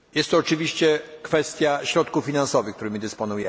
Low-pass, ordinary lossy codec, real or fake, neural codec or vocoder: none; none; real; none